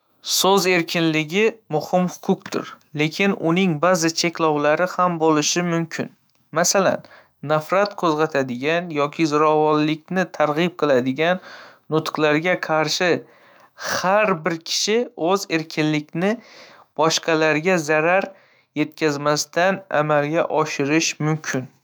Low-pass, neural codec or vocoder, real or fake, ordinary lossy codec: none; autoencoder, 48 kHz, 128 numbers a frame, DAC-VAE, trained on Japanese speech; fake; none